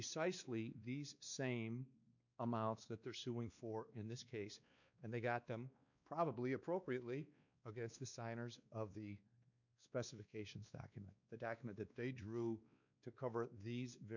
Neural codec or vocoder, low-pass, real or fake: codec, 16 kHz, 2 kbps, X-Codec, WavLM features, trained on Multilingual LibriSpeech; 7.2 kHz; fake